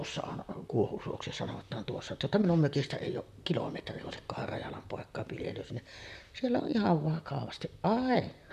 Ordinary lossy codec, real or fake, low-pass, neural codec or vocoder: none; fake; 14.4 kHz; vocoder, 44.1 kHz, 128 mel bands, Pupu-Vocoder